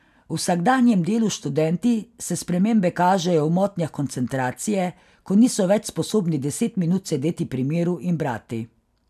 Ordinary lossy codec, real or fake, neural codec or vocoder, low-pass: none; real; none; 14.4 kHz